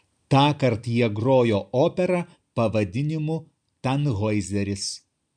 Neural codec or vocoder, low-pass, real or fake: none; 9.9 kHz; real